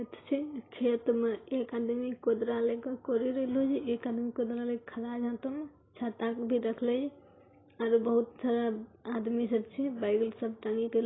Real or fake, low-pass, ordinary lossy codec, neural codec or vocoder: real; 7.2 kHz; AAC, 16 kbps; none